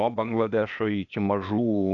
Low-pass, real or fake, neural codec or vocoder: 7.2 kHz; fake; codec, 16 kHz, 0.8 kbps, ZipCodec